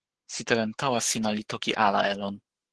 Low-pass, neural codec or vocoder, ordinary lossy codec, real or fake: 10.8 kHz; codec, 44.1 kHz, 7.8 kbps, Pupu-Codec; Opus, 16 kbps; fake